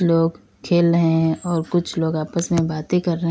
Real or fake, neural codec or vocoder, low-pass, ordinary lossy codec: real; none; none; none